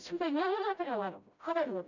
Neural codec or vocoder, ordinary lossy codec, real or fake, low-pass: codec, 16 kHz, 0.5 kbps, FreqCodec, smaller model; none; fake; 7.2 kHz